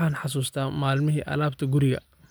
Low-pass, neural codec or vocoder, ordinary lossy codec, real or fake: none; none; none; real